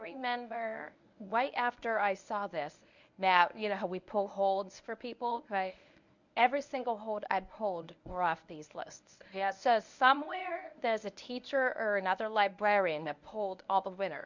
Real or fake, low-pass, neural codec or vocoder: fake; 7.2 kHz; codec, 24 kHz, 0.9 kbps, WavTokenizer, medium speech release version 1